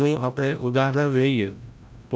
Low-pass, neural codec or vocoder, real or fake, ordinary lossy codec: none; codec, 16 kHz, 0.5 kbps, FreqCodec, larger model; fake; none